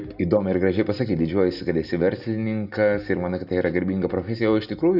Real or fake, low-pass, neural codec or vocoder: real; 5.4 kHz; none